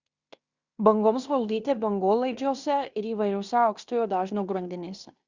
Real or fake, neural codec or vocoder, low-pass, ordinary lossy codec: fake; codec, 16 kHz in and 24 kHz out, 0.9 kbps, LongCat-Audio-Codec, fine tuned four codebook decoder; 7.2 kHz; Opus, 64 kbps